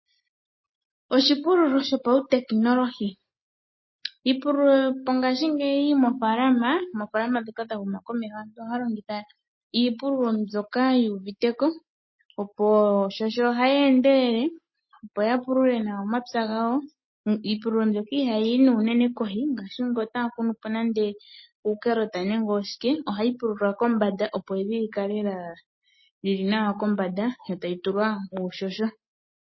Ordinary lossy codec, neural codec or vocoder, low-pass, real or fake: MP3, 24 kbps; none; 7.2 kHz; real